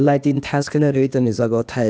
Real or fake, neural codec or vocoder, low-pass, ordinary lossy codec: fake; codec, 16 kHz, 0.8 kbps, ZipCodec; none; none